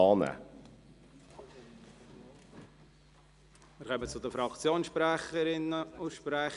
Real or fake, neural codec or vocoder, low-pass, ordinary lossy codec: real; none; 10.8 kHz; none